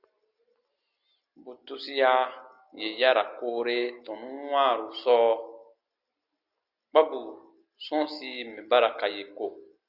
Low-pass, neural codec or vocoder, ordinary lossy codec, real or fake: 5.4 kHz; none; Opus, 64 kbps; real